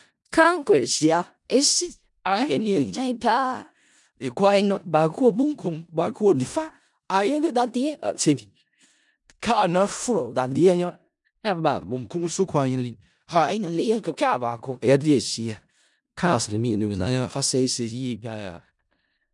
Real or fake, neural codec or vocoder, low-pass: fake; codec, 16 kHz in and 24 kHz out, 0.4 kbps, LongCat-Audio-Codec, four codebook decoder; 10.8 kHz